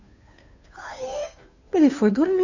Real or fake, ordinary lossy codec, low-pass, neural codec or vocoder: fake; none; 7.2 kHz; codec, 16 kHz, 2 kbps, FunCodec, trained on Chinese and English, 25 frames a second